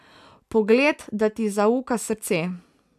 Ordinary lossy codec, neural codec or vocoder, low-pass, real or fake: none; none; 14.4 kHz; real